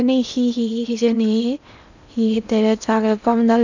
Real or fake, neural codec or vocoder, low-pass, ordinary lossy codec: fake; codec, 16 kHz in and 24 kHz out, 0.8 kbps, FocalCodec, streaming, 65536 codes; 7.2 kHz; none